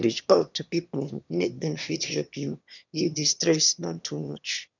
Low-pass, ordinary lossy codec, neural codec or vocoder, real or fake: 7.2 kHz; none; autoencoder, 22.05 kHz, a latent of 192 numbers a frame, VITS, trained on one speaker; fake